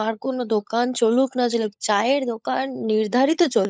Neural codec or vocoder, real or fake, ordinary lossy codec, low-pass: codec, 16 kHz, 16 kbps, FunCodec, trained on LibriTTS, 50 frames a second; fake; none; none